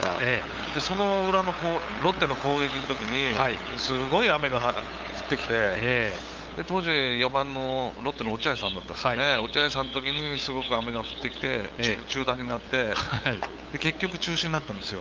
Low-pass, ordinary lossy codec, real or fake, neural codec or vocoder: 7.2 kHz; Opus, 24 kbps; fake; codec, 16 kHz, 8 kbps, FunCodec, trained on LibriTTS, 25 frames a second